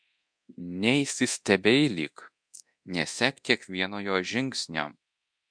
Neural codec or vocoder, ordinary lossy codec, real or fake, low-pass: codec, 24 kHz, 0.9 kbps, DualCodec; MP3, 64 kbps; fake; 9.9 kHz